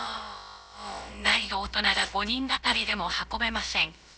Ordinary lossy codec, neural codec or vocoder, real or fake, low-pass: none; codec, 16 kHz, about 1 kbps, DyCAST, with the encoder's durations; fake; none